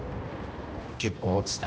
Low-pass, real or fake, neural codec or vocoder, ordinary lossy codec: none; fake; codec, 16 kHz, 1 kbps, X-Codec, HuBERT features, trained on balanced general audio; none